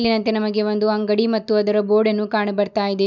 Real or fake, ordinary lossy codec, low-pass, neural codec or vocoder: real; none; 7.2 kHz; none